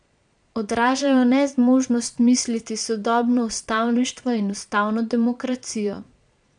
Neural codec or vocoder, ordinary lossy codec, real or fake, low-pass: vocoder, 22.05 kHz, 80 mel bands, Vocos; none; fake; 9.9 kHz